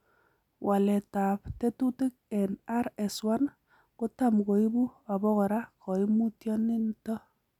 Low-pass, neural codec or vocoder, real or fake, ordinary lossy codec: 19.8 kHz; none; real; none